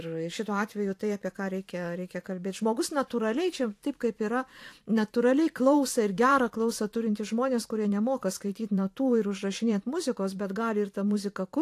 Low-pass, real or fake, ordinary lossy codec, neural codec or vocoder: 14.4 kHz; fake; AAC, 64 kbps; vocoder, 44.1 kHz, 128 mel bands every 512 samples, BigVGAN v2